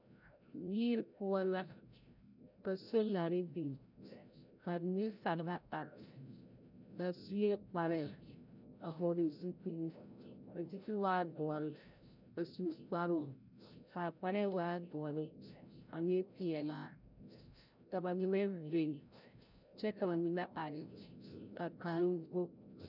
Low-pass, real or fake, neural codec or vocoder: 5.4 kHz; fake; codec, 16 kHz, 0.5 kbps, FreqCodec, larger model